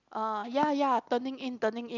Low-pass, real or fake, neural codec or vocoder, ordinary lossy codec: 7.2 kHz; real; none; none